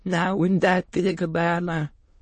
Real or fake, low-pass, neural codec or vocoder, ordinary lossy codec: fake; 9.9 kHz; autoencoder, 22.05 kHz, a latent of 192 numbers a frame, VITS, trained on many speakers; MP3, 32 kbps